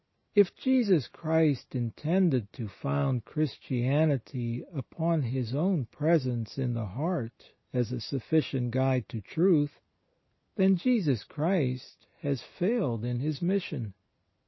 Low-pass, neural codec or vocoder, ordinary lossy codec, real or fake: 7.2 kHz; none; MP3, 24 kbps; real